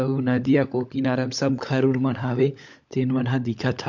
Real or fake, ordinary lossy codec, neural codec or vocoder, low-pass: fake; MP3, 64 kbps; codec, 16 kHz, 16 kbps, FunCodec, trained on LibriTTS, 50 frames a second; 7.2 kHz